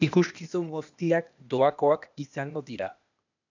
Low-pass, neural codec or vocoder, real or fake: 7.2 kHz; codec, 16 kHz, 0.8 kbps, ZipCodec; fake